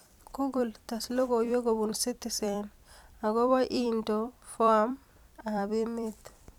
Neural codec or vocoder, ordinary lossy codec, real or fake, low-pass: vocoder, 44.1 kHz, 128 mel bands every 256 samples, BigVGAN v2; none; fake; 19.8 kHz